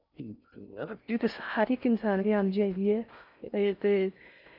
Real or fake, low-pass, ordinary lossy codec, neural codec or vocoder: fake; 5.4 kHz; none; codec, 16 kHz in and 24 kHz out, 0.6 kbps, FocalCodec, streaming, 4096 codes